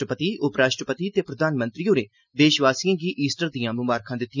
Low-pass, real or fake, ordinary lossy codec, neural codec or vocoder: 7.2 kHz; real; none; none